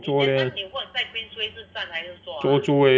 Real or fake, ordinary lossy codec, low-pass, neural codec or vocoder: real; none; none; none